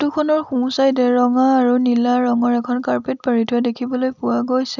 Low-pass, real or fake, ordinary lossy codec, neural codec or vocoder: 7.2 kHz; real; none; none